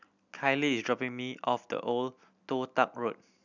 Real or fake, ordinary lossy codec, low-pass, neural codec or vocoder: real; none; 7.2 kHz; none